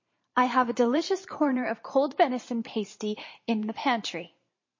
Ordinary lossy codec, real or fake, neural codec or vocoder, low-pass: MP3, 32 kbps; fake; vocoder, 44.1 kHz, 128 mel bands every 512 samples, BigVGAN v2; 7.2 kHz